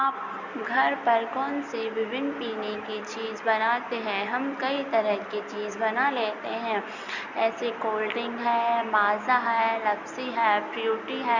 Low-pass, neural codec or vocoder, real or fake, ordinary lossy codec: 7.2 kHz; none; real; Opus, 64 kbps